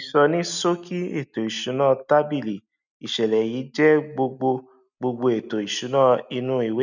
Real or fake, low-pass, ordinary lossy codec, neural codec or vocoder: real; 7.2 kHz; none; none